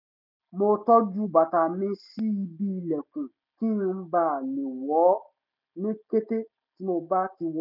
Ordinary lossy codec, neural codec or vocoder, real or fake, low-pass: none; none; real; 5.4 kHz